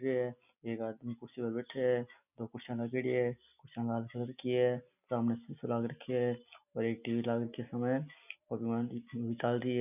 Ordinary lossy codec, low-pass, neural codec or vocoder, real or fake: none; 3.6 kHz; none; real